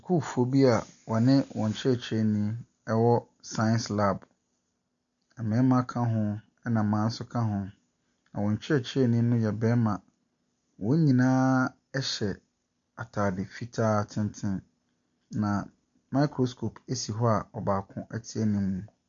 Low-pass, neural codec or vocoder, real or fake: 7.2 kHz; none; real